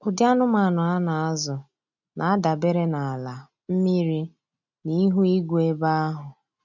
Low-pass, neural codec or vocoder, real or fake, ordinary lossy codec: 7.2 kHz; none; real; none